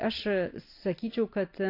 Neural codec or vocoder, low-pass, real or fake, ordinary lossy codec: none; 5.4 kHz; real; AAC, 32 kbps